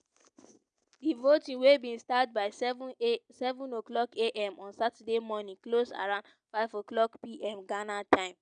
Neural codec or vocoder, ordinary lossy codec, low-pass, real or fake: none; none; 9.9 kHz; real